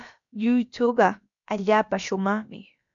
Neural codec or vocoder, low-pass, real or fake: codec, 16 kHz, about 1 kbps, DyCAST, with the encoder's durations; 7.2 kHz; fake